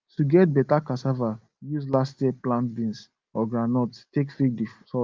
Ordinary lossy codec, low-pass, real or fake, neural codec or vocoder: Opus, 32 kbps; 7.2 kHz; real; none